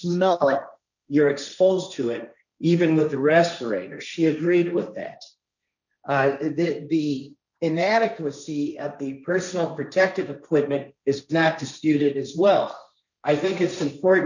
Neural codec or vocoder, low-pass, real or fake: codec, 16 kHz, 1.1 kbps, Voila-Tokenizer; 7.2 kHz; fake